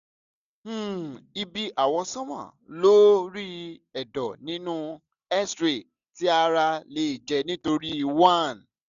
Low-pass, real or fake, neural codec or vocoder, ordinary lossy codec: 7.2 kHz; real; none; none